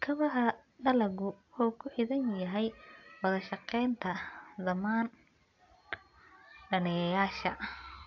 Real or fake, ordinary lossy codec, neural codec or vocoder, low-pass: real; AAC, 48 kbps; none; 7.2 kHz